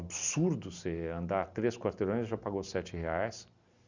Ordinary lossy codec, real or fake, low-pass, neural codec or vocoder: Opus, 64 kbps; real; 7.2 kHz; none